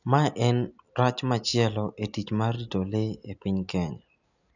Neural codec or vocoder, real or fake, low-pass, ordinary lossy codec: none; real; 7.2 kHz; none